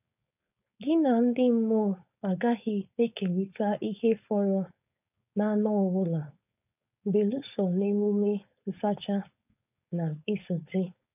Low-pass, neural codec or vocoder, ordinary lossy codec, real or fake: 3.6 kHz; codec, 16 kHz, 4.8 kbps, FACodec; none; fake